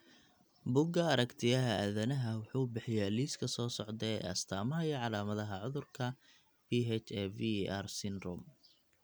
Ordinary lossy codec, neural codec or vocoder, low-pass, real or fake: none; none; none; real